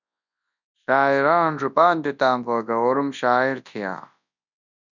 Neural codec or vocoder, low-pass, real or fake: codec, 24 kHz, 0.9 kbps, WavTokenizer, large speech release; 7.2 kHz; fake